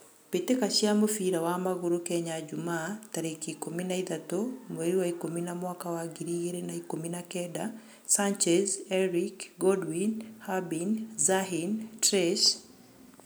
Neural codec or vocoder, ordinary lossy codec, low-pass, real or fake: none; none; none; real